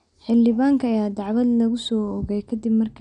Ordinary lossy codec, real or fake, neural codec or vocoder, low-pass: none; real; none; 9.9 kHz